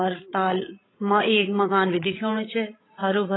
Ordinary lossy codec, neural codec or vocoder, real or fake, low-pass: AAC, 16 kbps; codec, 16 kHz, 16 kbps, FreqCodec, larger model; fake; 7.2 kHz